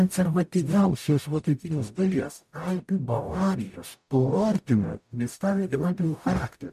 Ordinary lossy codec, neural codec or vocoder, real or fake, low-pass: MP3, 96 kbps; codec, 44.1 kHz, 0.9 kbps, DAC; fake; 14.4 kHz